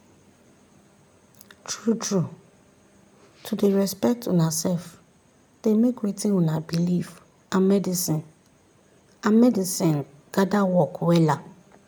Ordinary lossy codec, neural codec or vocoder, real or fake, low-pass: none; none; real; none